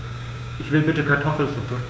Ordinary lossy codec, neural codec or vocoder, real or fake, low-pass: none; codec, 16 kHz, 6 kbps, DAC; fake; none